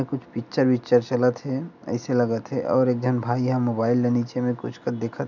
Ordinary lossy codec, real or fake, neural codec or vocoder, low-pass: none; real; none; 7.2 kHz